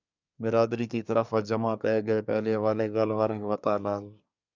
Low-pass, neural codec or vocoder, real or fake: 7.2 kHz; codec, 24 kHz, 1 kbps, SNAC; fake